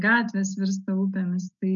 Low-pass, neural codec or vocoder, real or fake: 7.2 kHz; none; real